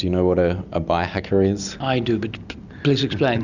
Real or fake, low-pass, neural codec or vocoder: real; 7.2 kHz; none